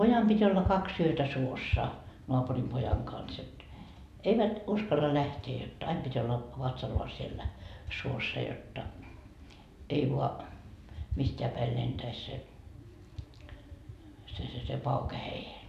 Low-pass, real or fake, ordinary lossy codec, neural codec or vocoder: 14.4 kHz; real; none; none